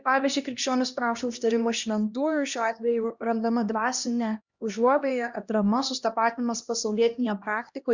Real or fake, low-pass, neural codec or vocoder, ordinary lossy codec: fake; 7.2 kHz; codec, 16 kHz, 1 kbps, X-Codec, HuBERT features, trained on LibriSpeech; Opus, 64 kbps